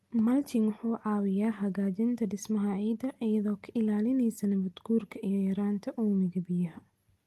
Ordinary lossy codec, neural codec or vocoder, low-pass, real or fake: Opus, 24 kbps; none; 14.4 kHz; real